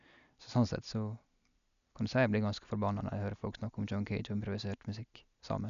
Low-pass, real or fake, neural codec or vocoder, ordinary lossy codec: 7.2 kHz; real; none; none